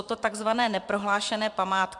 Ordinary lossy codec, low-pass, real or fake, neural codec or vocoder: MP3, 96 kbps; 10.8 kHz; fake; vocoder, 44.1 kHz, 128 mel bands every 512 samples, BigVGAN v2